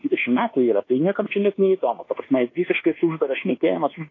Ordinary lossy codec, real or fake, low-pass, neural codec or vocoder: AAC, 32 kbps; fake; 7.2 kHz; codec, 24 kHz, 1.2 kbps, DualCodec